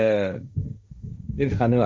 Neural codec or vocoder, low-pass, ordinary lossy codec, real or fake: codec, 16 kHz, 1.1 kbps, Voila-Tokenizer; none; none; fake